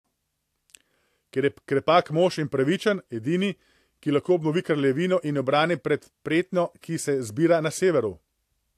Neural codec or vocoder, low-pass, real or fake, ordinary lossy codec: none; 14.4 kHz; real; AAC, 64 kbps